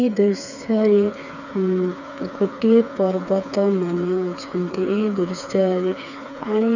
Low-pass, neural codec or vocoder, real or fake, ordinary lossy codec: 7.2 kHz; codec, 16 kHz, 4 kbps, FreqCodec, smaller model; fake; none